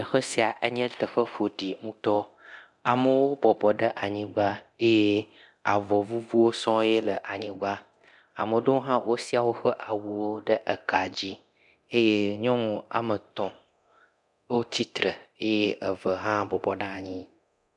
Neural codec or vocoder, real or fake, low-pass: codec, 24 kHz, 0.9 kbps, DualCodec; fake; 10.8 kHz